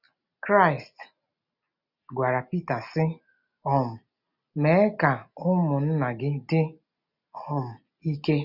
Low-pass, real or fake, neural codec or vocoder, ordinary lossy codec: 5.4 kHz; real; none; none